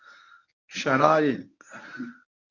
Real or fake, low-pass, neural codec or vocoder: fake; 7.2 kHz; codec, 24 kHz, 0.9 kbps, WavTokenizer, medium speech release version 1